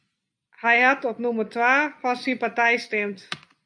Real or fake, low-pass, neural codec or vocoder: real; 9.9 kHz; none